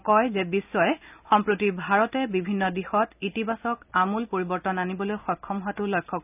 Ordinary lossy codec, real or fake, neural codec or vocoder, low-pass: none; real; none; 3.6 kHz